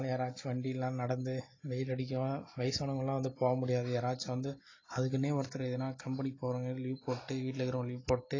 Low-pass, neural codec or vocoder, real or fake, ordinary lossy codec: 7.2 kHz; none; real; AAC, 32 kbps